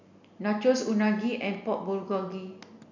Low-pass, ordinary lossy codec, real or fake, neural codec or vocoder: 7.2 kHz; none; real; none